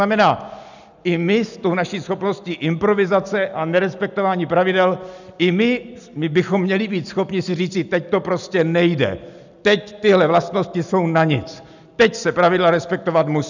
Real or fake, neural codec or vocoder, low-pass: real; none; 7.2 kHz